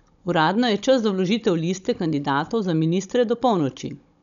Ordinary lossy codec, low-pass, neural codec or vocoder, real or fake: none; 7.2 kHz; codec, 16 kHz, 16 kbps, FunCodec, trained on Chinese and English, 50 frames a second; fake